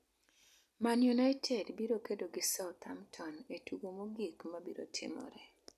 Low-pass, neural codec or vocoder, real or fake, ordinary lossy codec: 14.4 kHz; none; real; none